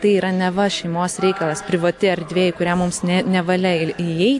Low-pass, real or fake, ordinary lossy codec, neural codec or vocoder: 10.8 kHz; real; AAC, 64 kbps; none